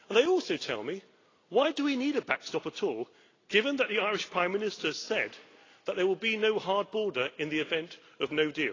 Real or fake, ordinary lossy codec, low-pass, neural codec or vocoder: real; AAC, 32 kbps; 7.2 kHz; none